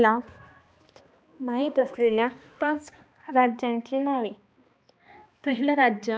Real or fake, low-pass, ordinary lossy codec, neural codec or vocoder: fake; none; none; codec, 16 kHz, 2 kbps, X-Codec, HuBERT features, trained on balanced general audio